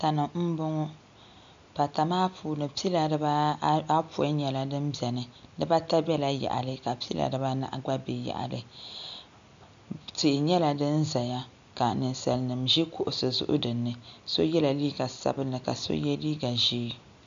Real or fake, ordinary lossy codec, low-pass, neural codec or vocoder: real; MP3, 64 kbps; 7.2 kHz; none